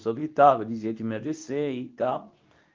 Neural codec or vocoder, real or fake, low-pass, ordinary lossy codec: codec, 24 kHz, 0.9 kbps, WavTokenizer, medium speech release version 2; fake; 7.2 kHz; Opus, 32 kbps